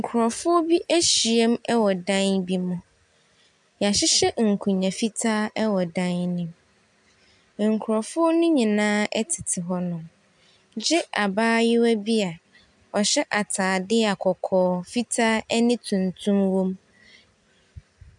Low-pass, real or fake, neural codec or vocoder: 10.8 kHz; real; none